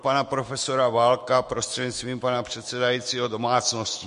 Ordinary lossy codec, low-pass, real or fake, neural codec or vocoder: MP3, 48 kbps; 14.4 kHz; fake; autoencoder, 48 kHz, 128 numbers a frame, DAC-VAE, trained on Japanese speech